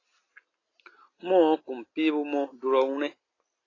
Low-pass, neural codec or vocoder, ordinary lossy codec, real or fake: 7.2 kHz; none; AAC, 32 kbps; real